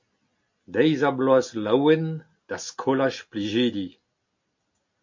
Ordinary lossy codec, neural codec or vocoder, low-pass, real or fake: MP3, 48 kbps; none; 7.2 kHz; real